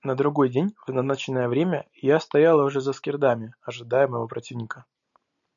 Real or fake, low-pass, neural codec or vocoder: real; 7.2 kHz; none